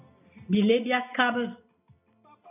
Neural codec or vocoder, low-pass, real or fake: none; 3.6 kHz; real